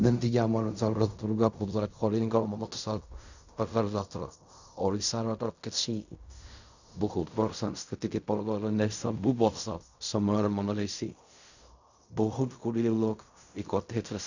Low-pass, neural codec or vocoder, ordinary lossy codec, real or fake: 7.2 kHz; codec, 16 kHz in and 24 kHz out, 0.4 kbps, LongCat-Audio-Codec, fine tuned four codebook decoder; none; fake